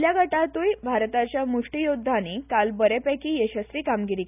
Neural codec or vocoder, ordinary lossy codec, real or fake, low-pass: none; none; real; 3.6 kHz